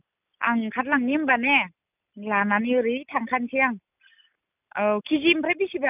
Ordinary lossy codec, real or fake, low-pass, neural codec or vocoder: none; real; 3.6 kHz; none